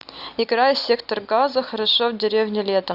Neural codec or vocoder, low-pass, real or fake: none; 5.4 kHz; real